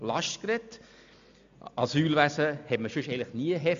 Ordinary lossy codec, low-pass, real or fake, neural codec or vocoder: MP3, 64 kbps; 7.2 kHz; real; none